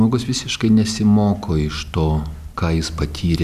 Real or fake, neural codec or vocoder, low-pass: real; none; 14.4 kHz